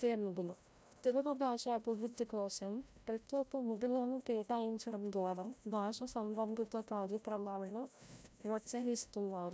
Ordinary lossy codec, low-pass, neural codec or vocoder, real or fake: none; none; codec, 16 kHz, 0.5 kbps, FreqCodec, larger model; fake